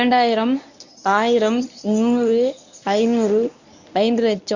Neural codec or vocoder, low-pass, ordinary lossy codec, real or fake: codec, 24 kHz, 0.9 kbps, WavTokenizer, medium speech release version 1; 7.2 kHz; none; fake